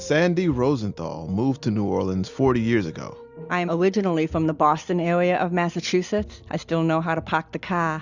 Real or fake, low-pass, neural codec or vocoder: real; 7.2 kHz; none